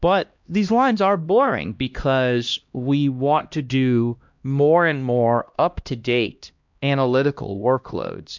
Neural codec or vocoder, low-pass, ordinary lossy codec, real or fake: codec, 16 kHz, 1 kbps, X-Codec, HuBERT features, trained on LibriSpeech; 7.2 kHz; MP3, 64 kbps; fake